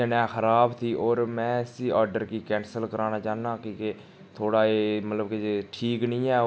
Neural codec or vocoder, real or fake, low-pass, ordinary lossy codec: none; real; none; none